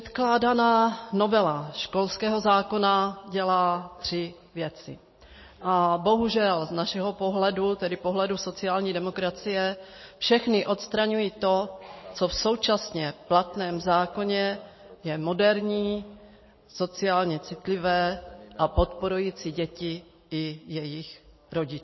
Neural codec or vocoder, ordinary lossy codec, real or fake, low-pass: none; MP3, 24 kbps; real; 7.2 kHz